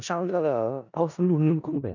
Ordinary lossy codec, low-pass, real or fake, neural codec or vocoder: none; 7.2 kHz; fake; codec, 16 kHz in and 24 kHz out, 0.4 kbps, LongCat-Audio-Codec, four codebook decoder